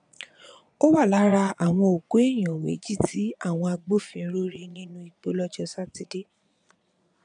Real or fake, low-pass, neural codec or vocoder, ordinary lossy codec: fake; 9.9 kHz; vocoder, 22.05 kHz, 80 mel bands, Vocos; none